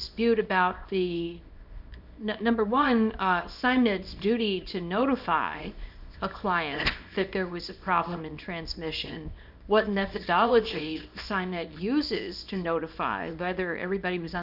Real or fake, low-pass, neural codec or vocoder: fake; 5.4 kHz; codec, 24 kHz, 0.9 kbps, WavTokenizer, small release